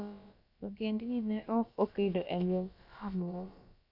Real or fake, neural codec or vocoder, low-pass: fake; codec, 16 kHz, about 1 kbps, DyCAST, with the encoder's durations; 5.4 kHz